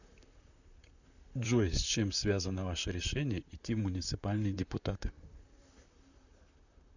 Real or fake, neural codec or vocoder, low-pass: fake; vocoder, 44.1 kHz, 128 mel bands, Pupu-Vocoder; 7.2 kHz